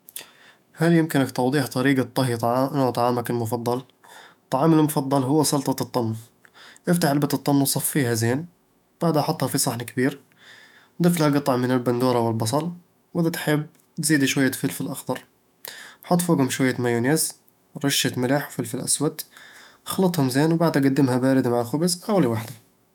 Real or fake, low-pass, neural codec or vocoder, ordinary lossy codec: fake; 19.8 kHz; autoencoder, 48 kHz, 128 numbers a frame, DAC-VAE, trained on Japanese speech; none